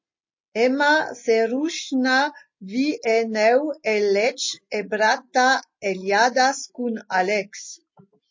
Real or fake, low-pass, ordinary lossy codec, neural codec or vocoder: real; 7.2 kHz; MP3, 32 kbps; none